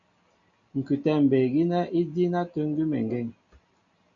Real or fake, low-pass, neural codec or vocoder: real; 7.2 kHz; none